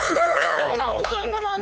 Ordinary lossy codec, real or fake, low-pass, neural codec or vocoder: none; fake; none; codec, 16 kHz, 4 kbps, X-Codec, HuBERT features, trained on LibriSpeech